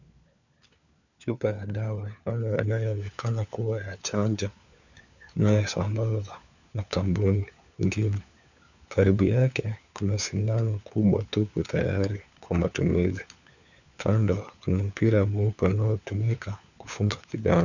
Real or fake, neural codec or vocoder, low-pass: fake; codec, 16 kHz, 4 kbps, FunCodec, trained on LibriTTS, 50 frames a second; 7.2 kHz